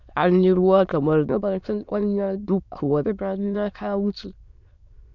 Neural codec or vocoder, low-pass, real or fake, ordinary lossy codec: autoencoder, 22.05 kHz, a latent of 192 numbers a frame, VITS, trained on many speakers; 7.2 kHz; fake; none